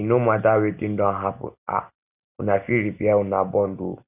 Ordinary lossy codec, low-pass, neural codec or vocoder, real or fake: none; 3.6 kHz; none; real